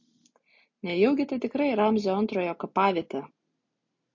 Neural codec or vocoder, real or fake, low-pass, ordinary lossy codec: none; real; 7.2 kHz; MP3, 48 kbps